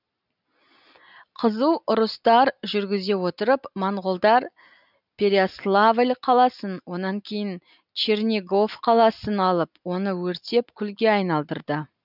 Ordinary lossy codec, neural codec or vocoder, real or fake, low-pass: none; none; real; 5.4 kHz